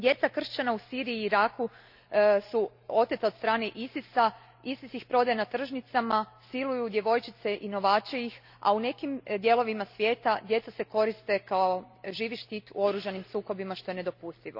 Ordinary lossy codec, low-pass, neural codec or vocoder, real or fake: none; 5.4 kHz; none; real